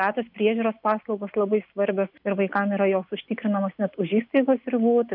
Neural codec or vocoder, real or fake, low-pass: none; real; 5.4 kHz